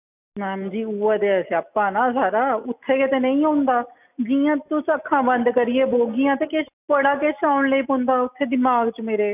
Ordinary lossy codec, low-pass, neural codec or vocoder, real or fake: none; 3.6 kHz; none; real